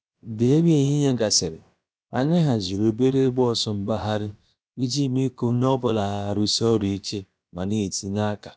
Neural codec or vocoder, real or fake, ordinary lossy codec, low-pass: codec, 16 kHz, about 1 kbps, DyCAST, with the encoder's durations; fake; none; none